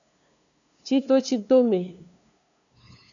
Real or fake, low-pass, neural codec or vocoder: fake; 7.2 kHz; codec, 16 kHz, 2 kbps, FunCodec, trained on LibriTTS, 25 frames a second